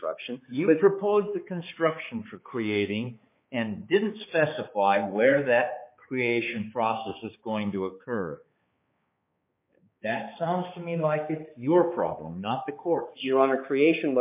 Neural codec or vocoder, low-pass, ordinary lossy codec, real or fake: codec, 16 kHz, 4 kbps, X-Codec, HuBERT features, trained on balanced general audio; 3.6 kHz; MP3, 24 kbps; fake